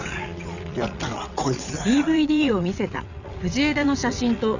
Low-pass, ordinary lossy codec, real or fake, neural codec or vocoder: 7.2 kHz; none; fake; vocoder, 22.05 kHz, 80 mel bands, WaveNeXt